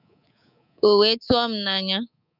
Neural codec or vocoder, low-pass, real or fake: codec, 24 kHz, 3.1 kbps, DualCodec; 5.4 kHz; fake